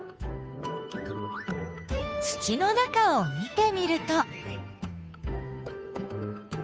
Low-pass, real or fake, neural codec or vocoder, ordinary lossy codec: none; fake; codec, 16 kHz, 2 kbps, FunCodec, trained on Chinese and English, 25 frames a second; none